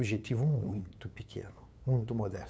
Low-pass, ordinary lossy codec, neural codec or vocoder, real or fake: none; none; codec, 16 kHz, 8 kbps, FunCodec, trained on LibriTTS, 25 frames a second; fake